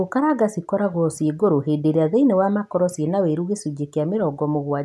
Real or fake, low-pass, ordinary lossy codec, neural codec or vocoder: real; none; none; none